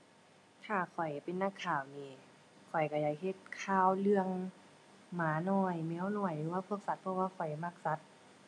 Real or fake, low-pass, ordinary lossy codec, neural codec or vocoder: real; none; none; none